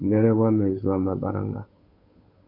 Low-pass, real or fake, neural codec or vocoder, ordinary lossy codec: 5.4 kHz; fake; codec, 16 kHz, 8 kbps, FunCodec, trained on Chinese and English, 25 frames a second; MP3, 32 kbps